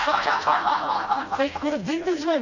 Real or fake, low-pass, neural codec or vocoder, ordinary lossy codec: fake; 7.2 kHz; codec, 16 kHz, 1 kbps, FreqCodec, smaller model; none